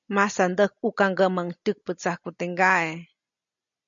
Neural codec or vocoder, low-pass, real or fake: none; 7.2 kHz; real